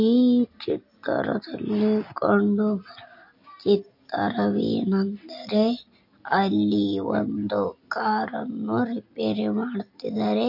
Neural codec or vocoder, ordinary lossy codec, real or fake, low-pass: none; MP3, 32 kbps; real; 5.4 kHz